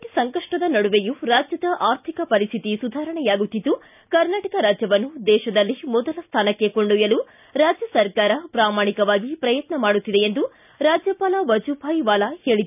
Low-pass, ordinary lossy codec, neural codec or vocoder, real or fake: 3.6 kHz; none; none; real